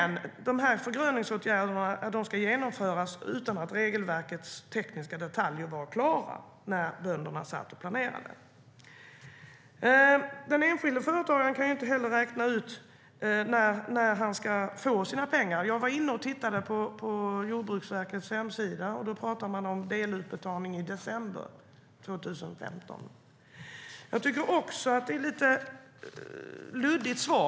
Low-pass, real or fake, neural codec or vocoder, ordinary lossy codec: none; real; none; none